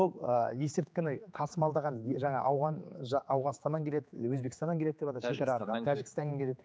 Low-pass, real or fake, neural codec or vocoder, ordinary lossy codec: none; fake; codec, 16 kHz, 4 kbps, X-Codec, HuBERT features, trained on general audio; none